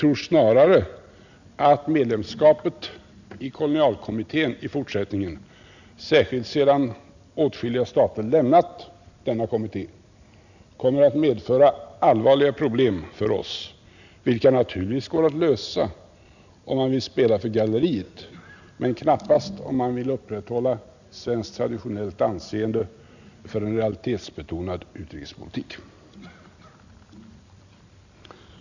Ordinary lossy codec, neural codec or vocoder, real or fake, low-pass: none; none; real; 7.2 kHz